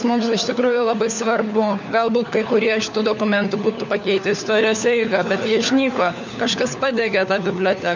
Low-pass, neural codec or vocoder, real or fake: 7.2 kHz; codec, 16 kHz, 4 kbps, FunCodec, trained on LibriTTS, 50 frames a second; fake